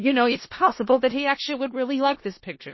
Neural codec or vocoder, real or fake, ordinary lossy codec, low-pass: codec, 16 kHz in and 24 kHz out, 0.4 kbps, LongCat-Audio-Codec, four codebook decoder; fake; MP3, 24 kbps; 7.2 kHz